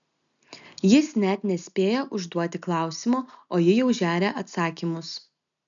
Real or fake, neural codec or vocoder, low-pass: real; none; 7.2 kHz